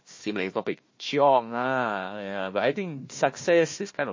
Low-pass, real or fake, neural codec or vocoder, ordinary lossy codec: 7.2 kHz; fake; codec, 16 kHz, 1 kbps, FunCodec, trained on Chinese and English, 50 frames a second; MP3, 32 kbps